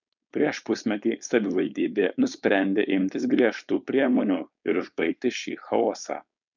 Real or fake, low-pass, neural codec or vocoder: fake; 7.2 kHz; codec, 16 kHz, 4.8 kbps, FACodec